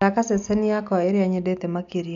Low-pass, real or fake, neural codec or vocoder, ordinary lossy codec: 7.2 kHz; real; none; none